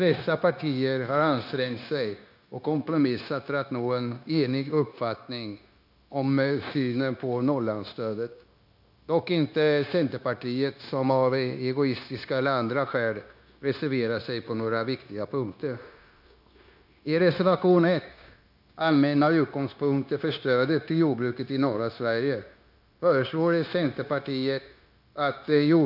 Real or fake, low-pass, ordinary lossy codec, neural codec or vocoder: fake; 5.4 kHz; none; codec, 16 kHz, 0.9 kbps, LongCat-Audio-Codec